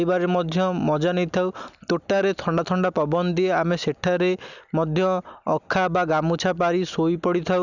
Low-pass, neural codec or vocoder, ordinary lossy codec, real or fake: 7.2 kHz; none; none; real